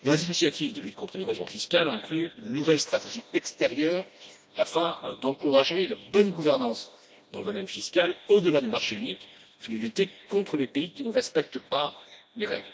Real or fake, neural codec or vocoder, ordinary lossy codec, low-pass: fake; codec, 16 kHz, 1 kbps, FreqCodec, smaller model; none; none